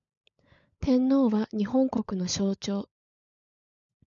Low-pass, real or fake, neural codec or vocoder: 7.2 kHz; fake; codec, 16 kHz, 16 kbps, FunCodec, trained on LibriTTS, 50 frames a second